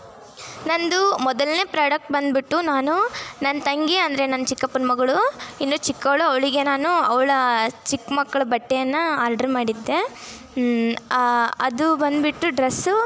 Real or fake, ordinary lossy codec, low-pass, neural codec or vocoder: real; none; none; none